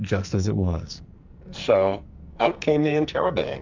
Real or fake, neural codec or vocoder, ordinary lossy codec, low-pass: fake; codec, 44.1 kHz, 2.6 kbps, SNAC; MP3, 64 kbps; 7.2 kHz